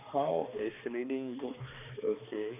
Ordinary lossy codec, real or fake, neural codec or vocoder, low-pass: none; fake; codec, 16 kHz, 2 kbps, X-Codec, HuBERT features, trained on balanced general audio; 3.6 kHz